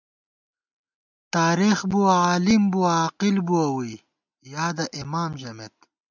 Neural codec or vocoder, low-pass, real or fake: none; 7.2 kHz; real